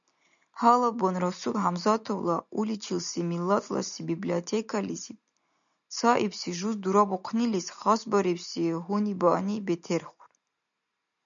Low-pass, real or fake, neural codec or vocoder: 7.2 kHz; real; none